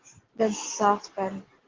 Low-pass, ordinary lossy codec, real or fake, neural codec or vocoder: 7.2 kHz; Opus, 16 kbps; real; none